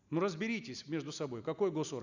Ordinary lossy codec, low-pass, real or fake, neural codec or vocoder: none; 7.2 kHz; real; none